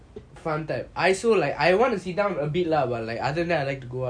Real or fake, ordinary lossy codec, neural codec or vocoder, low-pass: real; none; none; 9.9 kHz